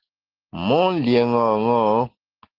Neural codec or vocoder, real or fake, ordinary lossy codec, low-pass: none; real; Opus, 16 kbps; 5.4 kHz